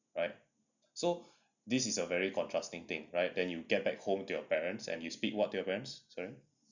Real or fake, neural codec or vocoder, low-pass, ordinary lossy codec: real; none; 7.2 kHz; none